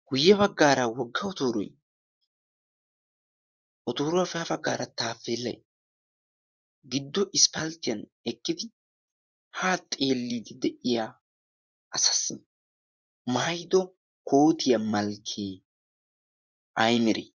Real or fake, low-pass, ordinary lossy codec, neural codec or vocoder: fake; 7.2 kHz; Opus, 64 kbps; vocoder, 24 kHz, 100 mel bands, Vocos